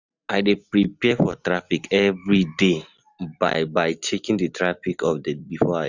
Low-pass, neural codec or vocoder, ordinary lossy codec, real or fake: 7.2 kHz; none; none; real